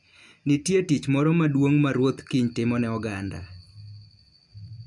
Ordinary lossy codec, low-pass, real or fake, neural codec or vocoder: none; 10.8 kHz; real; none